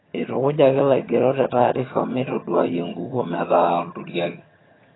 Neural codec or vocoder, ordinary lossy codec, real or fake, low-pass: vocoder, 22.05 kHz, 80 mel bands, HiFi-GAN; AAC, 16 kbps; fake; 7.2 kHz